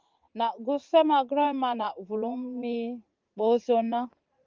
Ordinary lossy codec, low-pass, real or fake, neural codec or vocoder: Opus, 32 kbps; 7.2 kHz; fake; vocoder, 44.1 kHz, 80 mel bands, Vocos